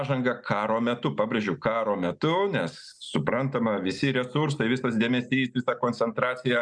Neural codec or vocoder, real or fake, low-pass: none; real; 9.9 kHz